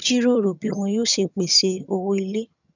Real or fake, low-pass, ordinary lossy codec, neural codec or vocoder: fake; 7.2 kHz; none; vocoder, 22.05 kHz, 80 mel bands, HiFi-GAN